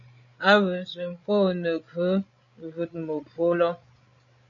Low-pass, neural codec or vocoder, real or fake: 7.2 kHz; codec, 16 kHz, 8 kbps, FreqCodec, larger model; fake